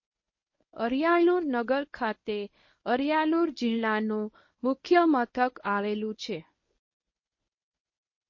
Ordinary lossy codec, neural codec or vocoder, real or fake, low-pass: MP3, 32 kbps; codec, 24 kHz, 0.9 kbps, WavTokenizer, medium speech release version 1; fake; 7.2 kHz